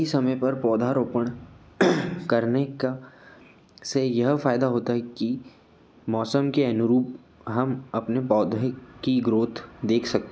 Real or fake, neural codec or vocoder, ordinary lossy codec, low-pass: real; none; none; none